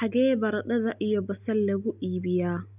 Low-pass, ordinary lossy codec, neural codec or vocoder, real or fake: 3.6 kHz; none; none; real